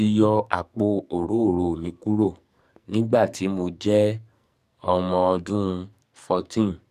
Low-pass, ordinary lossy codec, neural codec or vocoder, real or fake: 14.4 kHz; none; codec, 44.1 kHz, 2.6 kbps, SNAC; fake